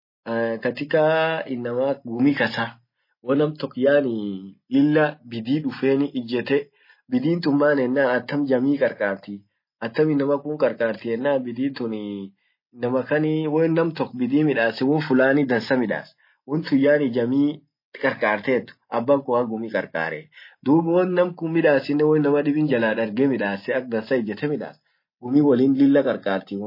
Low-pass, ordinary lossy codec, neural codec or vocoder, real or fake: 5.4 kHz; MP3, 24 kbps; none; real